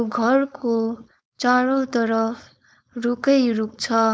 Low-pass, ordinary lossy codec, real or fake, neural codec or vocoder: none; none; fake; codec, 16 kHz, 4.8 kbps, FACodec